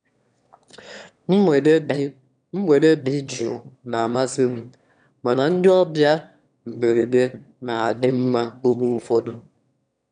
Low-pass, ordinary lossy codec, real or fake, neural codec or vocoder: 9.9 kHz; none; fake; autoencoder, 22.05 kHz, a latent of 192 numbers a frame, VITS, trained on one speaker